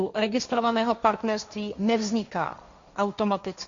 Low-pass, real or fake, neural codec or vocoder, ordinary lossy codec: 7.2 kHz; fake; codec, 16 kHz, 1.1 kbps, Voila-Tokenizer; Opus, 64 kbps